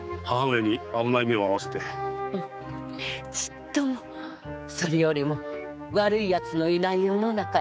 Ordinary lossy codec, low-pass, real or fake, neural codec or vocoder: none; none; fake; codec, 16 kHz, 4 kbps, X-Codec, HuBERT features, trained on general audio